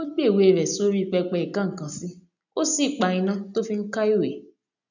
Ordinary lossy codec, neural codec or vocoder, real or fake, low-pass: none; none; real; 7.2 kHz